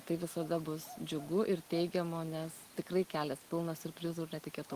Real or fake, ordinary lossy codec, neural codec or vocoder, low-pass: real; Opus, 24 kbps; none; 14.4 kHz